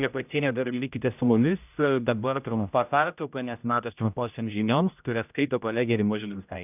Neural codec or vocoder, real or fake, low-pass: codec, 16 kHz, 0.5 kbps, X-Codec, HuBERT features, trained on general audio; fake; 3.6 kHz